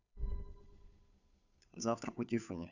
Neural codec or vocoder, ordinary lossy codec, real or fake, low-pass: codec, 16 kHz, 2 kbps, FunCodec, trained on Chinese and English, 25 frames a second; none; fake; 7.2 kHz